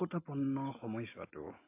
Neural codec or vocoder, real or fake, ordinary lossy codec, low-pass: none; real; AAC, 24 kbps; 3.6 kHz